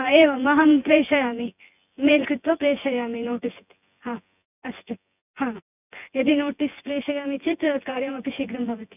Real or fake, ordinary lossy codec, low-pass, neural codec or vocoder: fake; none; 3.6 kHz; vocoder, 24 kHz, 100 mel bands, Vocos